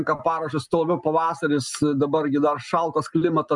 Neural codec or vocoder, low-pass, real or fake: none; 10.8 kHz; real